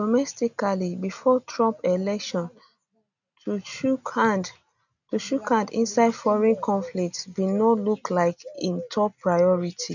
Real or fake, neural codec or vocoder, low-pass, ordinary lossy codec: real; none; 7.2 kHz; none